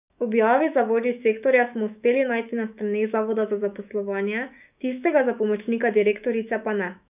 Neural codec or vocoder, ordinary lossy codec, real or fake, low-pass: autoencoder, 48 kHz, 128 numbers a frame, DAC-VAE, trained on Japanese speech; none; fake; 3.6 kHz